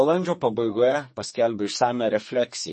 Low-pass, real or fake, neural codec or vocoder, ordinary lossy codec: 10.8 kHz; fake; codec, 32 kHz, 1.9 kbps, SNAC; MP3, 32 kbps